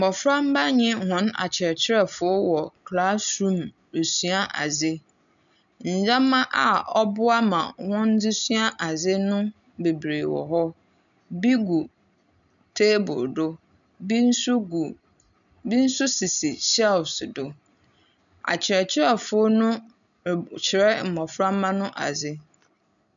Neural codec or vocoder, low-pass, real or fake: none; 7.2 kHz; real